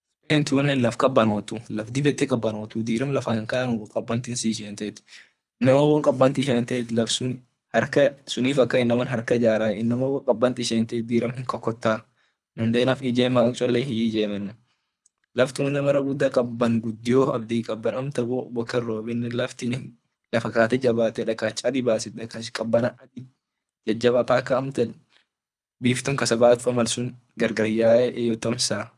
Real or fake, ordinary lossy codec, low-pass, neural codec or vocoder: fake; none; none; codec, 24 kHz, 3 kbps, HILCodec